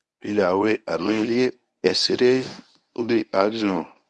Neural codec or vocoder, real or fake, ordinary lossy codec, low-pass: codec, 24 kHz, 0.9 kbps, WavTokenizer, medium speech release version 1; fake; none; none